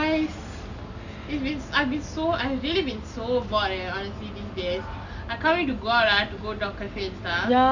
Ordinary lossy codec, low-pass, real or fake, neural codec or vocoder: none; 7.2 kHz; real; none